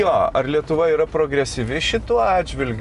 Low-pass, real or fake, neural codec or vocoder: 10.8 kHz; real; none